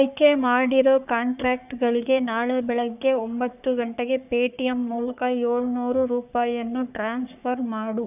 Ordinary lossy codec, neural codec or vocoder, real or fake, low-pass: none; codec, 44.1 kHz, 3.4 kbps, Pupu-Codec; fake; 3.6 kHz